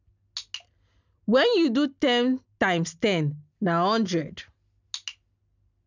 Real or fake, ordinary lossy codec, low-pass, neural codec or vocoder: real; none; 7.2 kHz; none